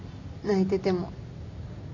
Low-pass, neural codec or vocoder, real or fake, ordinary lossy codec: 7.2 kHz; none; real; none